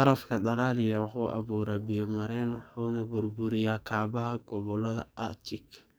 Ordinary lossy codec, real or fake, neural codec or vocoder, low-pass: none; fake; codec, 44.1 kHz, 2.6 kbps, SNAC; none